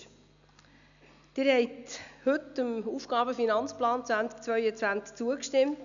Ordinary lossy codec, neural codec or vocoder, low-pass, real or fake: none; none; 7.2 kHz; real